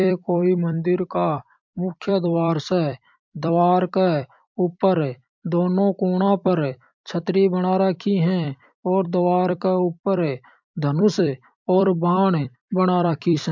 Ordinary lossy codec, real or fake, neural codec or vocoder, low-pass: MP3, 64 kbps; fake; vocoder, 44.1 kHz, 128 mel bands every 256 samples, BigVGAN v2; 7.2 kHz